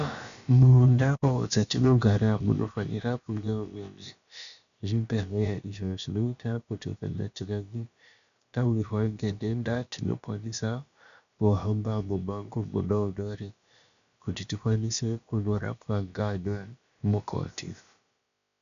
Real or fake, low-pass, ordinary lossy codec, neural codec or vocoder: fake; 7.2 kHz; MP3, 96 kbps; codec, 16 kHz, about 1 kbps, DyCAST, with the encoder's durations